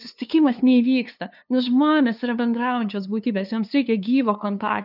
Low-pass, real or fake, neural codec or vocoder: 5.4 kHz; fake; codec, 16 kHz, 2 kbps, FunCodec, trained on LibriTTS, 25 frames a second